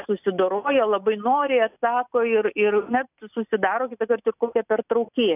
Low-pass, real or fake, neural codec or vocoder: 3.6 kHz; real; none